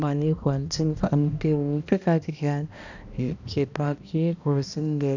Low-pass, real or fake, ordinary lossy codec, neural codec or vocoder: 7.2 kHz; fake; none; codec, 16 kHz, 1 kbps, X-Codec, HuBERT features, trained on balanced general audio